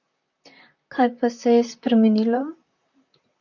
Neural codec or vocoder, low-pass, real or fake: vocoder, 44.1 kHz, 128 mel bands, Pupu-Vocoder; 7.2 kHz; fake